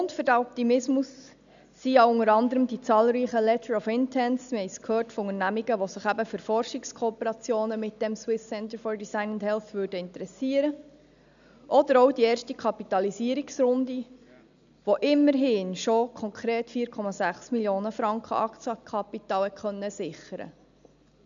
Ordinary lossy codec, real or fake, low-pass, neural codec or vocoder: none; real; 7.2 kHz; none